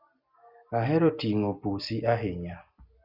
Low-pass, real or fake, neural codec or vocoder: 5.4 kHz; real; none